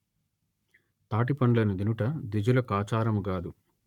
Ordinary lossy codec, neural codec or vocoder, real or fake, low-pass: none; codec, 44.1 kHz, 7.8 kbps, Pupu-Codec; fake; 19.8 kHz